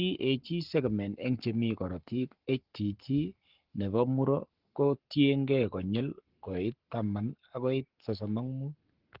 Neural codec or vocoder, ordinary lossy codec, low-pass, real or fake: codec, 44.1 kHz, 7.8 kbps, Pupu-Codec; Opus, 16 kbps; 5.4 kHz; fake